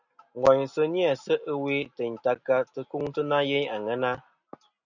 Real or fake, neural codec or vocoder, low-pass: real; none; 7.2 kHz